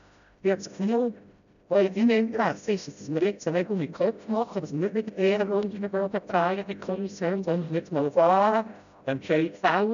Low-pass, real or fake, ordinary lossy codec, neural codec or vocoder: 7.2 kHz; fake; none; codec, 16 kHz, 0.5 kbps, FreqCodec, smaller model